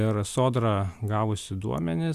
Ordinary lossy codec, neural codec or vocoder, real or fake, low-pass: Opus, 64 kbps; none; real; 14.4 kHz